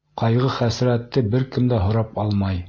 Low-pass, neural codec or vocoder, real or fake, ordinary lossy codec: 7.2 kHz; none; real; MP3, 32 kbps